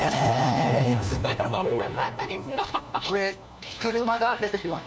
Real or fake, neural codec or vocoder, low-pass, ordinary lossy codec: fake; codec, 16 kHz, 1 kbps, FunCodec, trained on LibriTTS, 50 frames a second; none; none